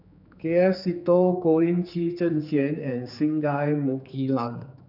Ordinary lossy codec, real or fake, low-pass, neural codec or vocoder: none; fake; 5.4 kHz; codec, 16 kHz, 4 kbps, X-Codec, HuBERT features, trained on general audio